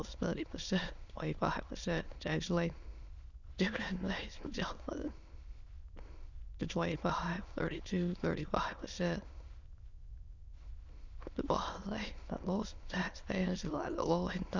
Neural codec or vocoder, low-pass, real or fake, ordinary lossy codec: autoencoder, 22.05 kHz, a latent of 192 numbers a frame, VITS, trained on many speakers; 7.2 kHz; fake; Opus, 64 kbps